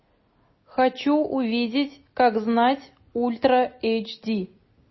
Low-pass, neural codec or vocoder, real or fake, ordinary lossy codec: 7.2 kHz; none; real; MP3, 24 kbps